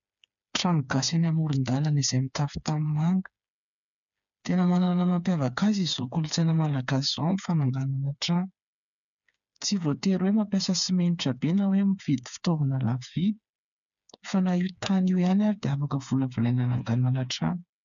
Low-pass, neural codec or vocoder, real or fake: 7.2 kHz; codec, 16 kHz, 4 kbps, FreqCodec, smaller model; fake